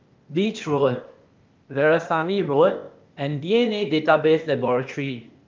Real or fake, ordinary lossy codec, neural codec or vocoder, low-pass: fake; Opus, 24 kbps; codec, 16 kHz, 0.8 kbps, ZipCodec; 7.2 kHz